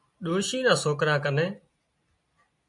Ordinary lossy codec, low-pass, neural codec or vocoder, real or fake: MP3, 64 kbps; 10.8 kHz; none; real